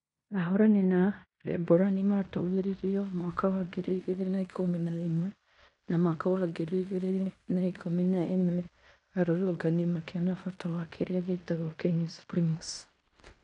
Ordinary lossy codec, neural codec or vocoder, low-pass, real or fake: none; codec, 16 kHz in and 24 kHz out, 0.9 kbps, LongCat-Audio-Codec, fine tuned four codebook decoder; 10.8 kHz; fake